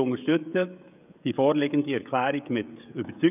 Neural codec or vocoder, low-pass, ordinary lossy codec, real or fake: codec, 16 kHz, 16 kbps, FreqCodec, smaller model; 3.6 kHz; none; fake